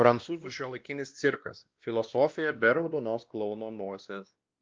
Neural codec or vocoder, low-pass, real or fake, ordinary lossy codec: codec, 16 kHz, 1 kbps, X-Codec, WavLM features, trained on Multilingual LibriSpeech; 7.2 kHz; fake; Opus, 24 kbps